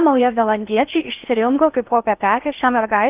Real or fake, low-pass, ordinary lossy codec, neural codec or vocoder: fake; 3.6 kHz; Opus, 24 kbps; codec, 16 kHz in and 24 kHz out, 0.6 kbps, FocalCodec, streaming, 4096 codes